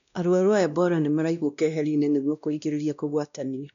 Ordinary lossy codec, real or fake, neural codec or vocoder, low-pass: none; fake; codec, 16 kHz, 1 kbps, X-Codec, WavLM features, trained on Multilingual LibriSpeech; 7.2 kHz